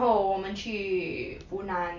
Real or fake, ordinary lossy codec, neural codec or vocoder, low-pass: real; none; none; 7.2 kHz